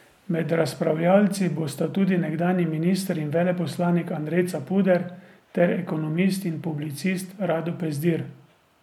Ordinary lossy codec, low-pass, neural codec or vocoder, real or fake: MP3, 96 kbps; 19.8 kHz; none; real